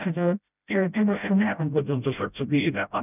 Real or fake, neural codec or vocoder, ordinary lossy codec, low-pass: fake; codec, 16 kHz, 0.5 kbps, FreqCodec, smaller model; none; 3.6 kHz